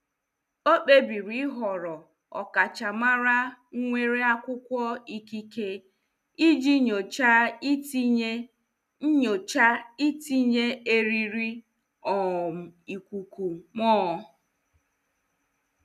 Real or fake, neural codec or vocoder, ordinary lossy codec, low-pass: real; none; none; 14.4 kHz